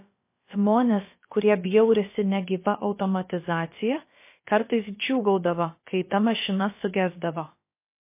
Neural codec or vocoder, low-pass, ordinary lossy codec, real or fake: codec, 16 kHz, about 1 kbps, DyCAST, with the encoder's durations; 3.6 kHz; MP3, 24 kbps; fake